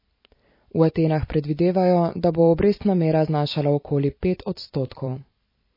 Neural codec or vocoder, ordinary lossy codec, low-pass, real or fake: vocoder, 44.1 kHz, 128 mel bands every 256 samples, BigVGAN v2; MP3, 24 kbps; 5.4 kHz; fake